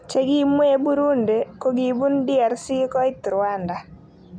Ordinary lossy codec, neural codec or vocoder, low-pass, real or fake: none; none; 9.9 kHz; real